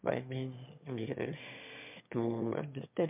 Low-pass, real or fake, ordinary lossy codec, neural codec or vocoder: 3.6 kHz; fake; MP3, 32 kbps; autoencoder, 22.05 kHz, a latent of 192 numbers a frame, VITS, trained on one speaker